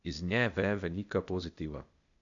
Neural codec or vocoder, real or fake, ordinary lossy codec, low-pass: codec, 16 kHz, 0.8 kbps, ZipCodec; fake; AAC, 64 kbps; 7.2 kHz